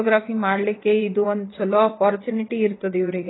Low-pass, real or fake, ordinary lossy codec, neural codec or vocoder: 7.2 kHz; fake; AAC, 16 kbps; vocoder, 22.05 kHz, 80 mel bands, WaveNeXt